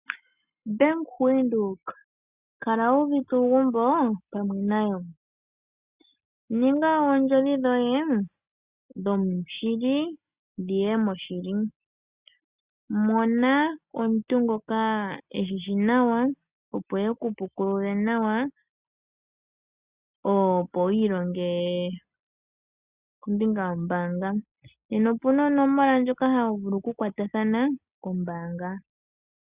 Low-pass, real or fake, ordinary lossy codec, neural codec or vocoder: 3.6 kHz; real; Opus, 64 kbps; none